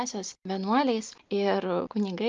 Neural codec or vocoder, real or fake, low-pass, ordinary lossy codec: none; real; 7.2 kHz; Opus, 24 kbps